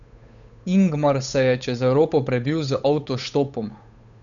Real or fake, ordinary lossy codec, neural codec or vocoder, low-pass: fake; none; codec, 16 kHz, 8 kbps, FunCodec, trained on Chinese and English, 25 frames a second; 7.2 kHz